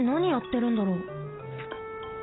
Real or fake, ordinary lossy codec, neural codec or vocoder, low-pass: real; AAC, 16 kbps; none; 7.2 kHz